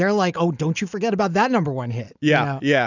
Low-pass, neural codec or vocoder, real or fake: 7.2 kHz; none; real